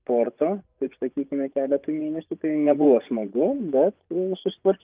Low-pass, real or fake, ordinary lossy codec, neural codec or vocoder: 3.6 kHz; fake; Opus, 24 kbps; vocoder, 24 kHz, 100 mel bands, Vocos